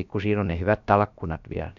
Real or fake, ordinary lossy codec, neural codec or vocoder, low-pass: fake; none; codec, 16 kHz, about 1 kbps, DyCAST, with the encoder's durations; 7.2 kHz